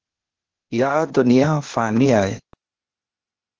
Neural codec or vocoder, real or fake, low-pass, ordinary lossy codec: codec, 16 kHz, 0.8 kbps, ZipCodec; fake; 7.2 kHz; Opus, 16 kbps